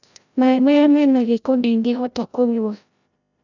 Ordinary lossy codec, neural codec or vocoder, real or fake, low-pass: none; codec, 16 kHz, 0.5 kbps, FreqCodec, larger model; fake; 7.2 kHz